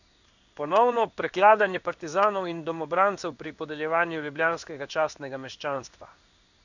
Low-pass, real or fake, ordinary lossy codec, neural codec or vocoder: 7.2 kHz; fake; none; codec, 16 kHz in and 24 kHz out, 1 kbps, XY-Tokenizer